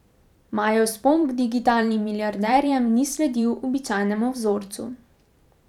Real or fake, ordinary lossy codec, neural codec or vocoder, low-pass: fake; none; vocoder, 44.1 kHz, 128 mel bands every 512 samples, BigVGAN v2; 19.8 kHz